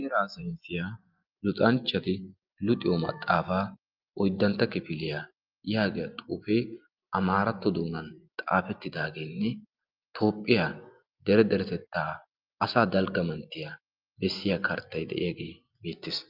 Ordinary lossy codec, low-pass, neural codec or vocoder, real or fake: Opus, 24 kbps; 5.4 kHz; none; real